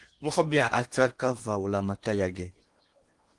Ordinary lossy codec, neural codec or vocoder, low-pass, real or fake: Opus, 24 kbps; codec, 16 kHz in and 24 kHz out, 0.8 kbps, FocalCodec, streaming, 65536 codes; 10.8 kHz; fake